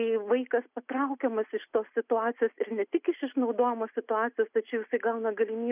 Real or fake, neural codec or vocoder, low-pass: real; none; 3.6 kHz